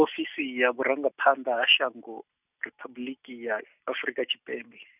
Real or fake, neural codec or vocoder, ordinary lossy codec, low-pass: real; none; none; 3.6 kHz